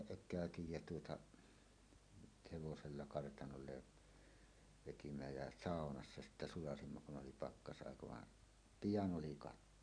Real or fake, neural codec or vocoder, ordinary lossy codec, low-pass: real; none; none; 9.9 kHz